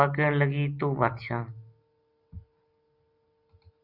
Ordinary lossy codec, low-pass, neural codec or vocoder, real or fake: Opus, 64 kbps; 5.4 kHz; none; real